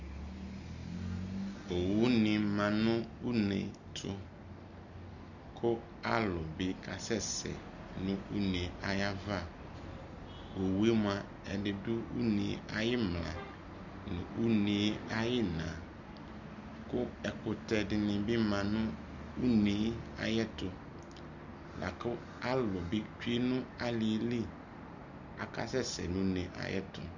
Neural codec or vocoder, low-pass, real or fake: none; 7.2 kHz; real